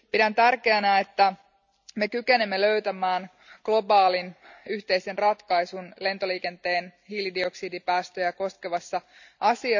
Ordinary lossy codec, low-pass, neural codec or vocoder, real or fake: none; 7.2 kHz; none; real